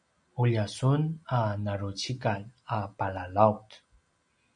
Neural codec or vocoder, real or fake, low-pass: none; real; 9.9 kHz